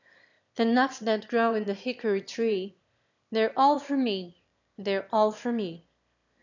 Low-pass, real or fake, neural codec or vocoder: 7.2 kHz; fake; autoencoder, 22.05 kHz, a latent of 192 numbers a frame, VITS, trained on one speaker